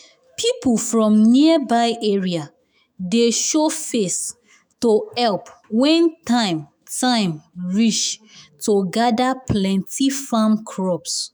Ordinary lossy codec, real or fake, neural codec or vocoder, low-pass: none; fake; autoencoder, 48 kHz, 128 numbers a frame, DAC-VAE, trained on Japanese speech; none